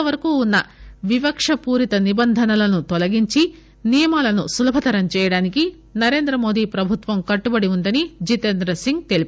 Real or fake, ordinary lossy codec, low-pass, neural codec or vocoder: real; none; none; none